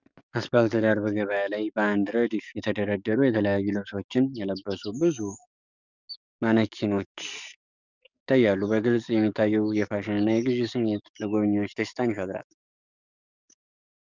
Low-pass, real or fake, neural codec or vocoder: 7.2 kHz; fake; codec, 44.1 kHz, 7.8 kbps, DAC